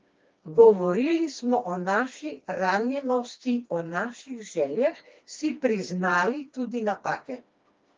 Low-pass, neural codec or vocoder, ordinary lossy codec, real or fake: 7.2 kHz; codec, 16 kHz, 2 kbps, FreqCodec, smaller model; Opus, 24 kbps; fake